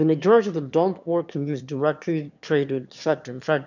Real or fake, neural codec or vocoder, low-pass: fake; autoencoder, 22.05 kHz, a latent of 192 numbers a frame, VITS, trained on one speaker; 7.2 kHz